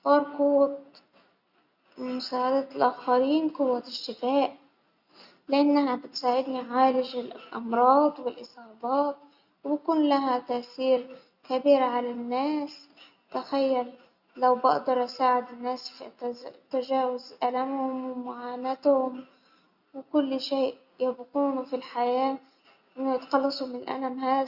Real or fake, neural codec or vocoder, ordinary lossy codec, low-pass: real; none; Opus, 64 kbps; 5.4 kHz